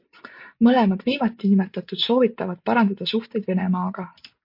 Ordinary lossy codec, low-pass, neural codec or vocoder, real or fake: MP3, 32 kbps; 5.4 kHz; none; real